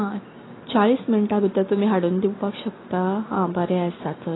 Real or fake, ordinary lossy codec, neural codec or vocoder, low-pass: real; AAC, 16 kbps; none; 7.2 kHz